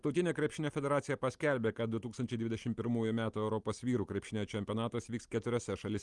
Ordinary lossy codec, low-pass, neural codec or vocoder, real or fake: Opus, 24 kbps; 10.8 kHz; none; real